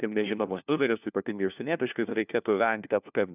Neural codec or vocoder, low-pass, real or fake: codec, 16 kHz, 1 kbps, FunCodec, trained on LibriTTS, 50 frames a second; 3.6 kHz; fake